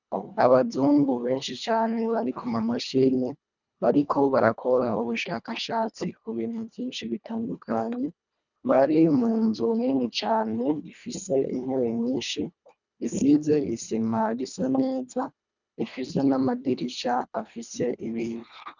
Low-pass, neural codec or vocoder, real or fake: 7.2 kHz; codec, 24 kHz, 1.5 kbps, HILCodec; fake